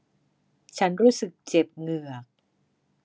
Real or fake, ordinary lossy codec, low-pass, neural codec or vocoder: real; none; none; none